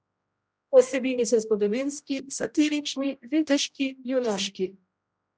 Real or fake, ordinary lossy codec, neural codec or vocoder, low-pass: fake; none; codec, 16 kHz, 0.5 kbps, X-Codec, HuBERT features, trained on general audio; none